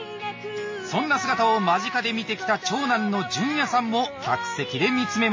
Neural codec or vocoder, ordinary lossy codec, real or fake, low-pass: none; MP3, 32 kbps; real; 7.2 kHz